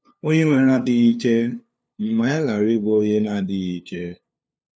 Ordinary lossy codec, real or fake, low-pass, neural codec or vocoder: none; fake; none; codec, 16 kHz, 2 kbps, FunCodec, trained on LibriTTS, 25 frames a second